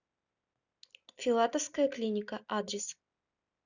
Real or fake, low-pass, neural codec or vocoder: real; 7.2 kHz; none